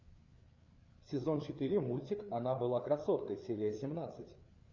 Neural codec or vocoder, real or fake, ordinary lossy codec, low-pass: codec, 16 kHz, 4 kbps, FreqCodec, larger model; fake; Opus, 64 kbps; 7.2 kHz